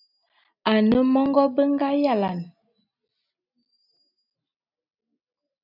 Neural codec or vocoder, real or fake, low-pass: none; real; 5.4 kHz